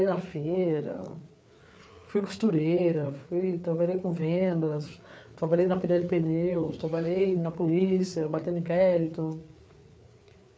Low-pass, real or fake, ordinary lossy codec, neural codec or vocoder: none; fake; none; codec, 16 kHz, 4 kbps, FreqCodec, larger model